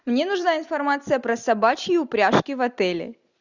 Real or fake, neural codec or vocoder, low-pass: real; none; 7.2 kHz